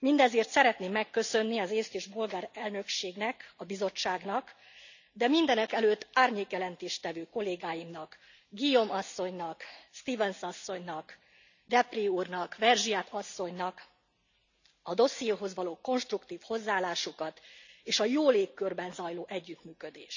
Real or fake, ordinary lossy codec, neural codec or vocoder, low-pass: real; none; none; 7.2 kHz